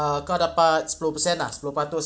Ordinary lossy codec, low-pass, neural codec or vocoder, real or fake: none; none; none; real